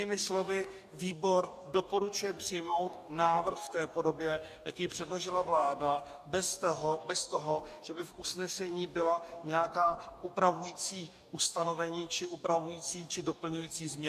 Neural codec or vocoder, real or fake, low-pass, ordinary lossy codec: codec, 44.1 kHz, 2.6 kbps, DAC; fake; 14.4 kHz; AAC, 96 kbps